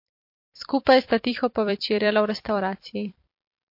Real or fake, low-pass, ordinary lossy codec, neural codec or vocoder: fake; 5.4 kHz; MP3, 32 kbps; vocoder, 44.1 kHz, 128 mel bands every 256 samples, BigVGAN v2